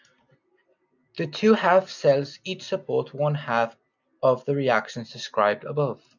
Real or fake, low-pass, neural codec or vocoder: real; 7.2 kHz; none